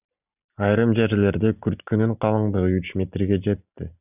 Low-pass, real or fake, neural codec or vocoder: 3.6 kHz; real; none